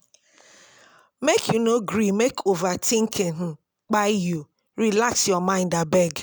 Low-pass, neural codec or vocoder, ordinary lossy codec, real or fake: none; none; none; real